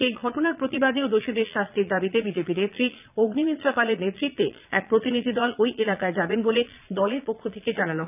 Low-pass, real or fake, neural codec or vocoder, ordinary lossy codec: 3.6 kHz; fake; vocoder, 22.05 kHz, 80 mel bands, Vocos; none